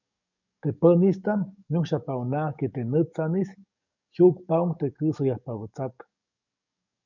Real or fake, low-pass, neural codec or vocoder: fake; 7.2 kHz; codec, 44.1 kHz, 7.8 kbps, DAC